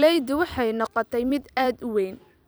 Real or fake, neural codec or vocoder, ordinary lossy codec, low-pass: fake; vocoder, 44.1 kHz, 128 mel bands every 256 samples, BigVGAN v2; none; none